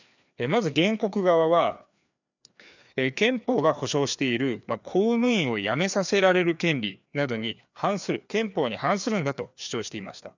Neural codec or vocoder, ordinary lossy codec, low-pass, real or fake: codec, 16 kHz, 2 kbps, FreqCodec, larger model; none; 7.2 kHz; fake